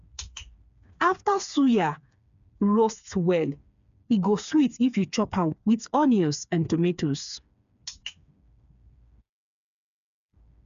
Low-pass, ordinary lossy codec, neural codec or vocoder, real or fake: 7.2 kHz; MP3, 64 kbps; codec, 16 kHz, 4 kbps, FreqCodec, smaller model; fake